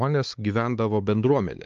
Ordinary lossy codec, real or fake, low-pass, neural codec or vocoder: Opus, 24 kbps; fake; 7.2 kHz; codec, 16 kHz, 4 kbps, X-Codec, WavLM features, trained on Multilingual LibriSpeech